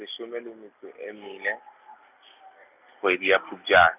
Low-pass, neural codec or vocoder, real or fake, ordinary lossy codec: 3.6 kHz; none; real; none